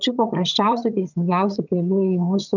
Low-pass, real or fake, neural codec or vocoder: 7.2 kHz; fake; vocoder, 22.05 kHz, 80 mel bands, HiFi-GAN